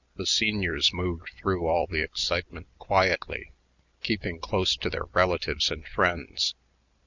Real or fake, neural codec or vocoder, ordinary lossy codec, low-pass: real; none; Opus, 64 kbps; 7.2 kHz